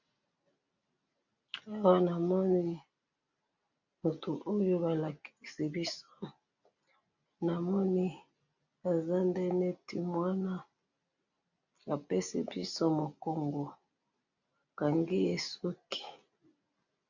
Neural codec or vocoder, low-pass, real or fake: none; 7.2 kHz; real